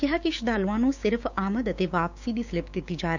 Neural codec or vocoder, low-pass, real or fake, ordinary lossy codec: codec, 16 kHz, 6 kbps, DAC; 7.2 kHz; fake; none